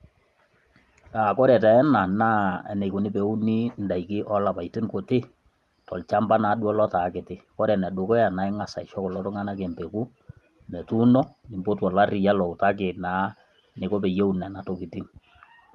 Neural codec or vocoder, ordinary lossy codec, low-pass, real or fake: none; Opus, 32 kbps; 14.4 kHz; real